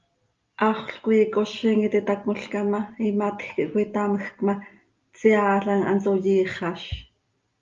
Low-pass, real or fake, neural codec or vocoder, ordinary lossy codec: 7.2 kHz; real; none; Opus, 32 kbps